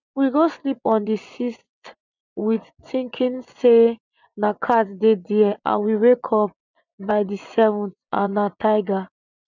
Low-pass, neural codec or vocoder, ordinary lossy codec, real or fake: 7.2 kHz; vocoder, 24 kHz, 100 mel bands, Vocos; none; fake